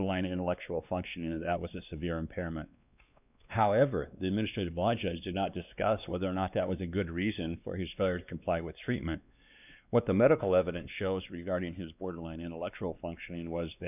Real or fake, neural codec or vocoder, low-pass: fake; codec, 16 kHz, 2 kbps, X-Codec, WavLM features, trained on Multilingual LibriSpeech; 3.6 kHz